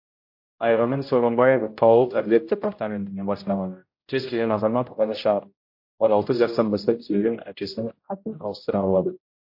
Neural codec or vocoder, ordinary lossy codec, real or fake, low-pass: codec, 16 kHz, 0.5 kbps, X-Codec, HuBERT features, trained on general audio; MP3, 32 kbps; fake; 5.4 kHz